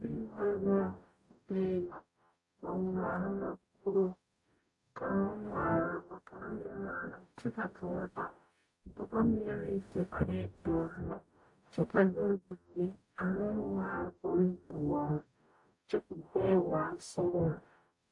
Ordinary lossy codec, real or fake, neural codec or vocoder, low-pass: AAC, 64 kbps; fake; codec, 44.1 kHz, 0.9 kbps, DAC; 10.8 kHz